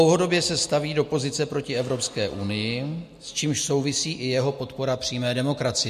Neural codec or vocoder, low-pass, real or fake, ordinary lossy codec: none; 14.4 kHz; real; MP3, 64 kbps